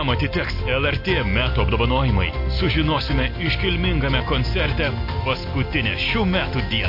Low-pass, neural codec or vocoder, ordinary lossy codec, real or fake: 5.4 kHz; none; MP3, 32 kbps; real